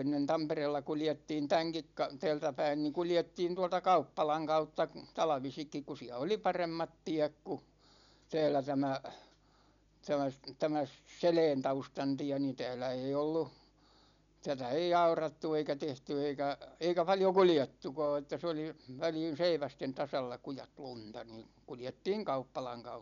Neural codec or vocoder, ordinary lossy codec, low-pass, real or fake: none; MP3, 96 kbps; 7.2 kHz; real